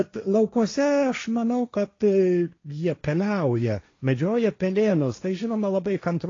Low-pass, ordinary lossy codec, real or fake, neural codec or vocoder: 7.2 kHz; AAC, 32 kbps; fake; codec, 16 kHz, 1.1 kbps, Voila-Tokenizer